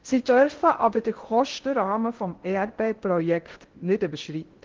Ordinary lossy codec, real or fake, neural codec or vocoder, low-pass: Opus, 16 kbps; fake; codec, 16 kHz in and 24 kHz out, 0.6 kbps, FocalCodec, streaming, 4096 codes; 7.2 kHz